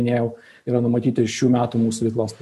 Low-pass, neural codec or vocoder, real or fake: 14.4 kHz; none; real